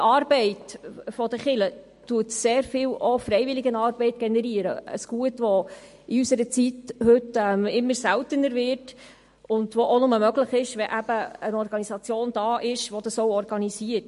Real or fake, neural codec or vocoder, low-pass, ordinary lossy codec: real; none; 14.4 kHz; MP3, 48 kbps